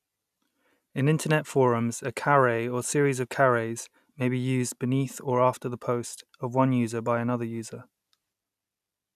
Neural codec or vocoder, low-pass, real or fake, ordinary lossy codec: none; 14.4 kHz; real; none